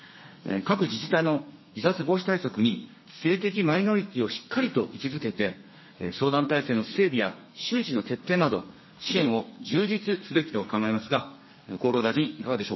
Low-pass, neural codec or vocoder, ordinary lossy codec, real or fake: 7.2 kHz; codec, 32 kHz, 1.9 kbps, SNAC; MP3, 24 kbps; fake